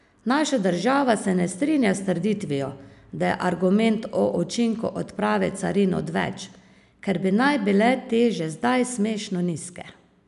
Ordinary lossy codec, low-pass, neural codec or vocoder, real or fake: none; 10.8 kHz; none; real